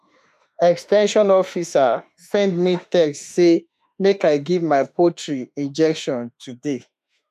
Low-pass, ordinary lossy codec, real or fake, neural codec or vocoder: 14.4 kHz; none; fake; autoencoder, 48 kHz, 32 numbers a frame, DAC-VAE, trained on Japanese speech